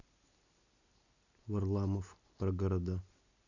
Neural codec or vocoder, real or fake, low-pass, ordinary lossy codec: none; real; 7.2 kHz; none